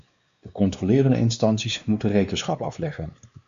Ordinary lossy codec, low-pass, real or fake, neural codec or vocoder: Opus, 64 kbps; 7.2 kHz; fake; codec, 16 kHz, 2 kbps, X-Codec, WavLM features, trained on Multilingual LibriSpeech